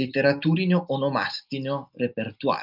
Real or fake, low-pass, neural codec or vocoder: real; 5.4 kHz; none